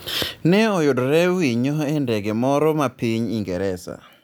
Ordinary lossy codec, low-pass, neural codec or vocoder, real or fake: none; none; none; real